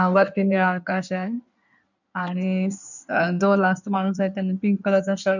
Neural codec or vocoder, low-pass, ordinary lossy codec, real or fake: codec, 16 kHz in and 24 kHz out, 2.2 kbps, FireRedTTS-2 codec; 7.2 kHz; none; fake